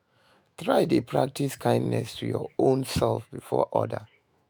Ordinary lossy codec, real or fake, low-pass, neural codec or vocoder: none; fake; none; autoencoder, 48 kHz, 128 numbers a frame, DAC-VAE, trained on Japanese speech